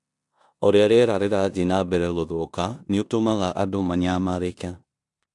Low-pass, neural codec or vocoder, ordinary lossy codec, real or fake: 10.8 kHz; codec, 16 kHz in and 24 kHz out, 0.9 kbps, LongCat-Audio-Codec, four codebook decoder; AAC, 64 kbps; fake